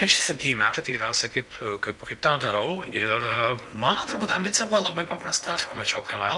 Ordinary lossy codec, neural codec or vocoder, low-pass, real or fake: MP3, 96 kbps; codec, 16 kHz in and 24 kHz out, 0.8 kbps, FocalCodec, streaming, 65536 codes; 10.8 kHz; fake